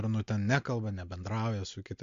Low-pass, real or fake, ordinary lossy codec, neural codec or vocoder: 7.2 kHz; real; MP3, 48 kbps; none